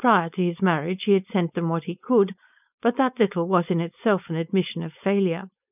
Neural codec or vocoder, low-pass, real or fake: codec, 16 kHz, 4.8 kbps, FACodec; 3.6 kHz; fake